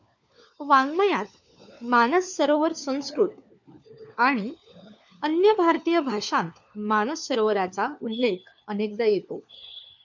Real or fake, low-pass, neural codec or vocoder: fake; 7.2 kHz; codec, 16 kHz, 4 kbps, FunCodec, trained on LibriTTS, 50 frames a second